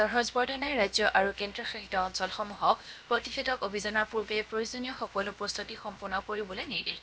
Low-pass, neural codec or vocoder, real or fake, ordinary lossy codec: none; codec, 16 kHz, about 1 kbps, DyCAST, with the encoder's durations; fake; none